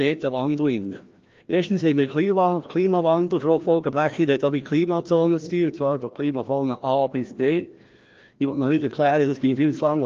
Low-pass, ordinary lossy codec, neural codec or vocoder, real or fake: 7.2 kHz; Opus, 32 kbps; codec, 16 kHz, 1 kbps, FreqCodec, larger model; fake